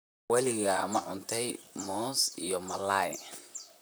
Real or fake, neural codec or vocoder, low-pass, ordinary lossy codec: fake; vocoder, 44.1 kHz, 128 mel bands, Pupu-Vocoder; none; none